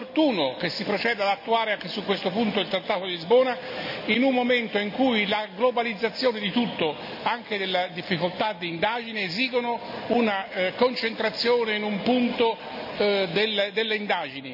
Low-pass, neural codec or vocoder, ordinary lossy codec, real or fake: 5.4 kHz; none; none; real